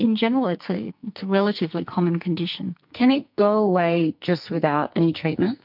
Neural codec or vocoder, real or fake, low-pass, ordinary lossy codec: codec, 44.1 kHz, 2.6 kbps, SNAC; fake; 5.4 kHz; MP3, 48 kbps